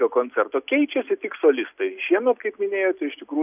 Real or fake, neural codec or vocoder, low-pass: real; none; 3.6 kHz